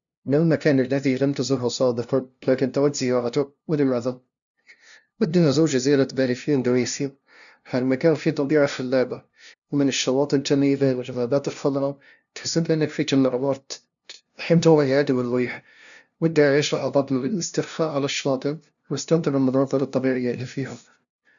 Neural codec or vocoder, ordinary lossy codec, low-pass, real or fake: codec, 16 kHz, 0.5 kbps, FunCodec, trained on LibriTTS, 25 frames a second; none; 7.2 kHz; fake